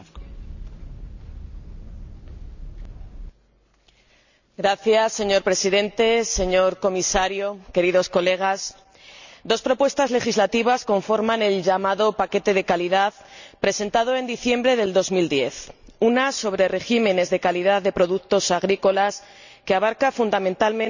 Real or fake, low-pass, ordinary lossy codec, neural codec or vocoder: real; 7.2 kHz; none; none